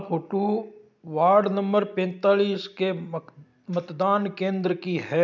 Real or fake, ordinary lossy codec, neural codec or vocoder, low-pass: real; none; none; 7.2 kHz